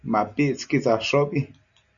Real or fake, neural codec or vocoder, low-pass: real; none; 7.2 kHz